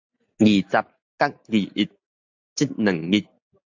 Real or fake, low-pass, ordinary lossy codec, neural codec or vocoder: real; 7.2 kHz; AAC, 48 kbps; none